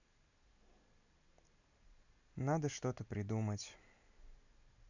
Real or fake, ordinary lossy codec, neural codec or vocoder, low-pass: real; none; none; 7.2 kHz